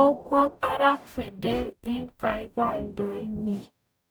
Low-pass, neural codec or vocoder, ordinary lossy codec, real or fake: none; codec, 44.1 kHz, 0.9 kbps, DAC; none; fake